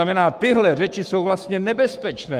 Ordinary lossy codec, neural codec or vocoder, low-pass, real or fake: Opus, 32 kbps; codec, 44.1 kHz, 7.8 kbps, Pupu-Codec; 14.4 kHz; fake